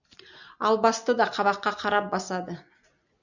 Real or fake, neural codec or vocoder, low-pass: real; none; 7.2 kHz